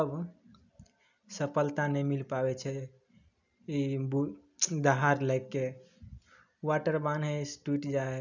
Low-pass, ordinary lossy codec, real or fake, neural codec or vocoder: 7.2 kHz; none; real; none